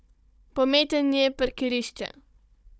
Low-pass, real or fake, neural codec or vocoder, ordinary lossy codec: none; fake; codec, 16 kHz, 16 kbps, FunCodec, trained on Chinese and English, 50 frames a second; none